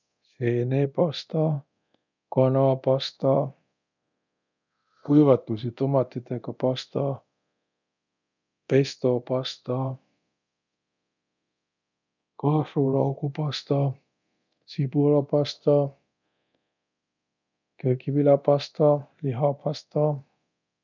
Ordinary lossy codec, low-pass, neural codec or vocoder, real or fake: none; 7.2 kHz; codec, 24 kHz, 0.9 kbps, DualCodec; fake